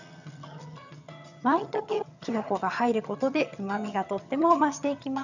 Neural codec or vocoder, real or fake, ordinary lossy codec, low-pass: vocoder, 22.05 kHz, 80 mel bands, HiFi-GAN; fake; none; 7.2 kHz